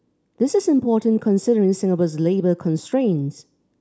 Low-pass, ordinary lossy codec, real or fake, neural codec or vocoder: none; none; real; none